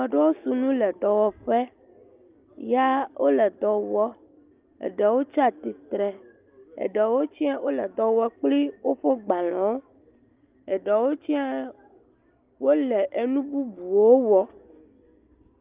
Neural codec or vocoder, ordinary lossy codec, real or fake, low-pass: none; Opus, 24 kbps; real; 3.6 kHz